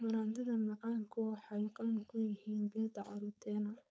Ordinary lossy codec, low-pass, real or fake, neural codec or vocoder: none; none; fake; codec, 16 kHz, 4.8 kbps, FACodec